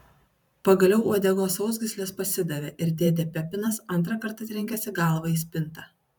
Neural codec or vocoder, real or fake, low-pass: vocoder, 44.1 kHz, 128 mel bands every 512 samples, BigVGAN v2; fake; 19.8 kHz